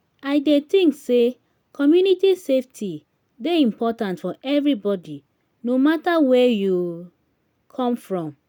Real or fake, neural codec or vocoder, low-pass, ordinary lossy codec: real; none; 19.8 kHz; none